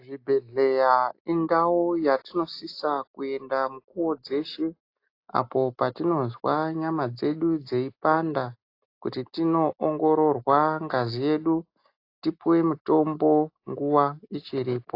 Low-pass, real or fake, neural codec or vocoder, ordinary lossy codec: 5.4 kHz; real; none; AAC, 32 kbps